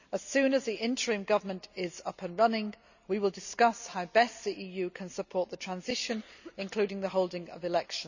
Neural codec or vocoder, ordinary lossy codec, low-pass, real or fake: none; none; 7.2 kHz; real